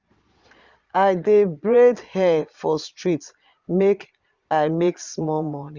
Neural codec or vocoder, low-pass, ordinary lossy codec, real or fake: vocoder, 22.05 kHz, 80 mel bands, Vocos; 7.2 kHz; Opus, 64 kbps; fake